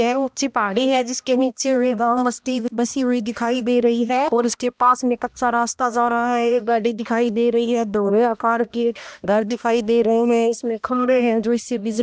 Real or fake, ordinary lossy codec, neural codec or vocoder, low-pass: fake; none; codec, 16 kHz, 1 kbps, X-Codec, HuBERT features, trained on balanced general audio; none